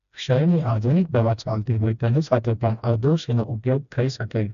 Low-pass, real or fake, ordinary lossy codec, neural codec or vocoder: 7.2 kHz; fake; none; codec, 16 kHz, 1 kbps, FreqCodec, smaller model